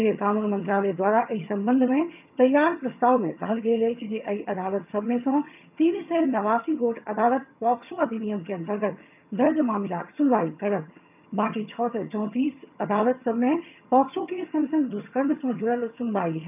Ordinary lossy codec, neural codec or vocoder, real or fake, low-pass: none; vocoder, 22.05 kHz, 80 mel bands, HiFi-GAN; fake; 3.6 kHz